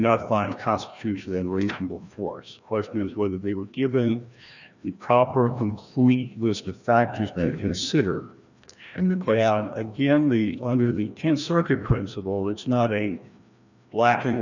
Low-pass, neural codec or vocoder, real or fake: 7.2 kHz; codec, 16 kHz, 1 kbps, FreqCodec, larger model; fake